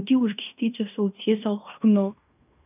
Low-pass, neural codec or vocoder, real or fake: 3.6 kHz; codec, 16 kHz in and 24 kHz out, 0.9 kbps, LongCat-Audio-Codec, fine tuned four codebook decoder; fake